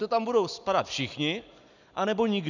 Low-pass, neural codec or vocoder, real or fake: 7.2 kHz; none; real